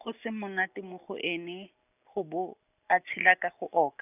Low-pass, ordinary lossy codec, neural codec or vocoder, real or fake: 3.6 kHz; none; none; real